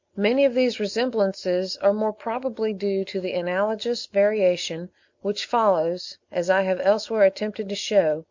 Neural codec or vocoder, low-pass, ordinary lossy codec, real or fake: none; 7.2 kHz; MP3, 48 kbps; real